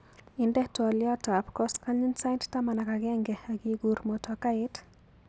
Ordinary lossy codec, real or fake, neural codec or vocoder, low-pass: none; real; none; none